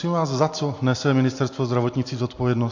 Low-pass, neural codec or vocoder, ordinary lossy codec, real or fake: 7.2 kHz; none; AAC, 48 kbps; real